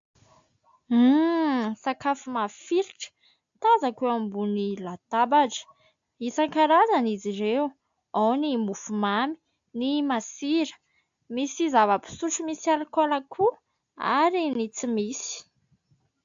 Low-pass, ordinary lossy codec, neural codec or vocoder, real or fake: 7.2 kHz; AAC, 64 kbps; none; real